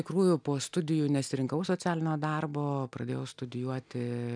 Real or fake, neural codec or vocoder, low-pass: real; none; 9.9 kHz